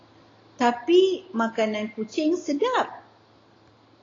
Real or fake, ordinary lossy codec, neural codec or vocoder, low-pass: real; AAC, 32 kbps; none; 7.2 kHz